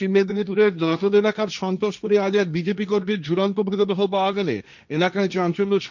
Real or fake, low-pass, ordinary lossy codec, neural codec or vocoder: fake; 7.2 kHz; none; codec, 16 kHz, 1.1 kbps, Voila-Tokenizer